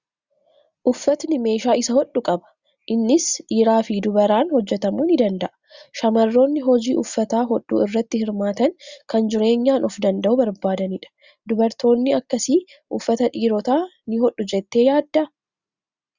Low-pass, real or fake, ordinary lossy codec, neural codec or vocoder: 7.2 kHz; real; Opus, 64 kbps; none